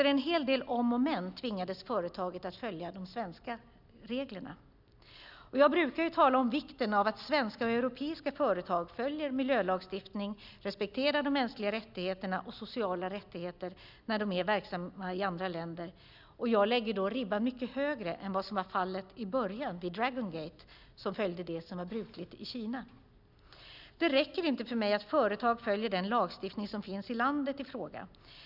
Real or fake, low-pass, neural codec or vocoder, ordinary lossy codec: real; 5.4 kHz; none; none